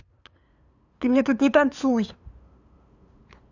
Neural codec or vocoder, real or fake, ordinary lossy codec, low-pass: codec, 16 kHz, 2 kbps, FunCodec, trained on LibriTTS, 25 frames a second; fake; none; 7.2 kHz